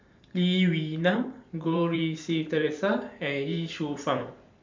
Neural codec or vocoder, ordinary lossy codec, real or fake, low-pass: vocoder, 44.1 kHz, 128 mel bands every 512 samples, BigVGAN v2; MP3, 64 kbps; fake; 7.2 kHz